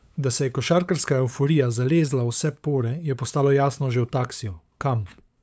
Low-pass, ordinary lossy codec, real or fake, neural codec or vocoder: none; none; fake; codec, 16 kHz, 8 kbps, FunCodec, trained on LibriTTS, 25 frames a second